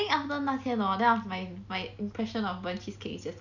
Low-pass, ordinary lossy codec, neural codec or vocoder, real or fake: 7.2 kHz; none; none; real